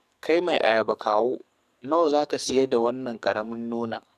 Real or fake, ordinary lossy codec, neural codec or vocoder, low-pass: fake; none; codec, 44.1 kHz, 2.6 kbps, SNAC; 14.4 kHz